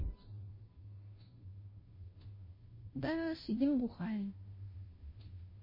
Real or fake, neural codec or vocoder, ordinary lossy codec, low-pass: fake; codec, 16 kHz, 0.5 kbps, FunCodec, trained on Chinese and English, 25 frames a second; MP3, 24 kbps; 5.4 kHz